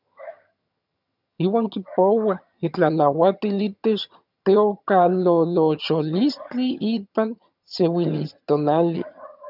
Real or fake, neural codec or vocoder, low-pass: fake; vocoder, 22.05 kHz, 80 mel bands, HiFi-GAN; 5.4 kHz